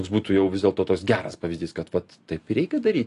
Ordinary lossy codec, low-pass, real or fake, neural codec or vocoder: AAC, 48 kbps; 10.8 kHz; real; none